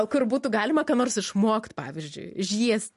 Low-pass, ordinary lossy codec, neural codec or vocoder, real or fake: 14.4 kHz; MP3, 48 kbps; none; real